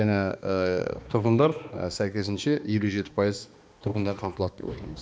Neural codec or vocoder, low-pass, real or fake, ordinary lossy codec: codec, 16 kHz, 2 kbps, X-Codec, HuBERT features, trained on balanced general audio; none; fake; none